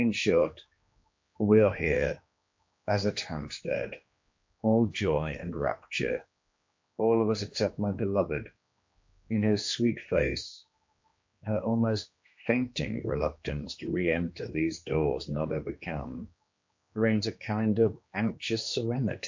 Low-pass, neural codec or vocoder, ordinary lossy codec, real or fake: 7.2 kHz; codec, 16 kHz, 2 kbps, X-Codec, HuBERT features, trained on general audio; MP3, 48 kbps; fake